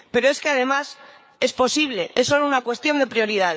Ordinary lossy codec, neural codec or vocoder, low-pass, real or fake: none; codec, 16 kHz, 4 kbps, FreqCodec, larger model; none; fake